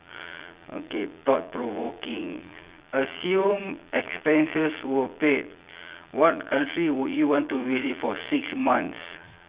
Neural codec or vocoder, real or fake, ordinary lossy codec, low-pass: vocoder, 22.05 kHz, 80 mel bands, Vocos; fake; Opus, 64 kbps; 3.6 kHz